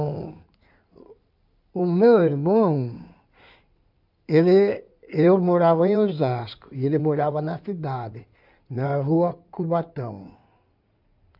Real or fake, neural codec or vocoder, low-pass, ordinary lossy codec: fake; codec, 16 kHz in and 24 kHz out, 2.2 kbps, FireRedTTS-2 codec; 5.4 kHz; none